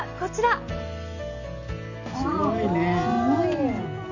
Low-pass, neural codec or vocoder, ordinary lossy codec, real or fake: 7.2 kHz; none; none; real